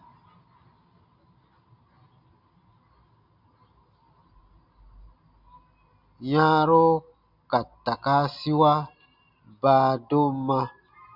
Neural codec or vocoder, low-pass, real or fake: none; 5.4 kHz; real